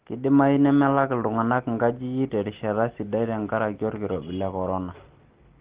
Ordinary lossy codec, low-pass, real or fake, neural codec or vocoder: Opus, 16 kbps; 3.6 kHz; real; none